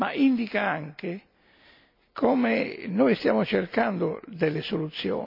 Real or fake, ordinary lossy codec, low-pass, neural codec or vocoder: real; MP3, 48 kbps; 5.4 kHz; none